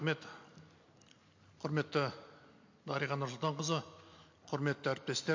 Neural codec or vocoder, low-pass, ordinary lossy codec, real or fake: none; 7.2 kHz; MP3, 48 kbps; real